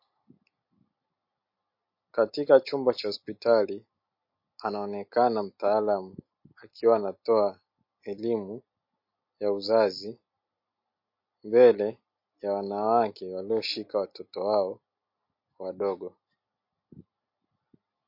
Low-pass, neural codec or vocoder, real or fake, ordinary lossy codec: 5.4 kHz; none; real; MP3, 32 kbps